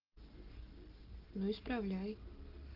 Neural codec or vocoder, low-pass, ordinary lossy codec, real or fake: none; 5.4 kHz; Opus, 16 kbps; real